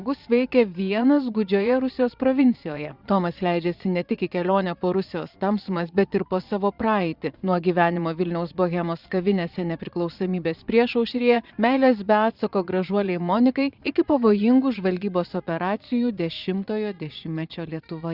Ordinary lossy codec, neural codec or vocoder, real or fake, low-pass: Opus, 64 kbps; vocoder, 22.05 kHz, 80 mel bands, Vocos; fake; 5.4 kHz